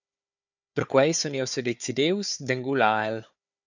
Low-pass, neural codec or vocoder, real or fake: 7.2 kHz; codec, 16 kHz, 4 kbps, FunCodec, trained on Chinese and English, 50 frames a second; fake